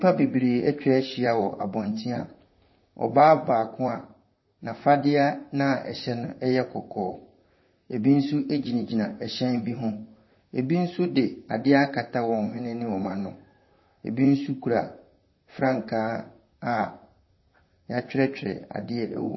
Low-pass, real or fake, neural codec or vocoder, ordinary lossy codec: 7.2 kHz; fake; vocoder, 44.1 kHz, 128 mel bands, Pupu-Vocoder; MP3, 24 kbps